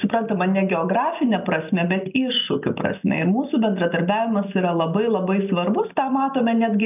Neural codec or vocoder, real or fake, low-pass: none; real; 3.6 kHz